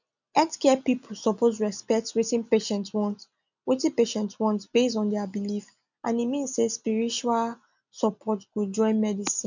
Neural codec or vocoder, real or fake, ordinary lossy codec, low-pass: none; real; none; 7.2 kHz